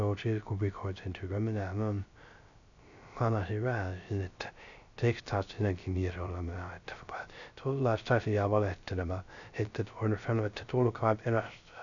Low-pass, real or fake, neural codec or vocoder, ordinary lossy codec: 7.2 kHz; fake; codec, 16 kHz, 0.3 kbps, FocalCodec; none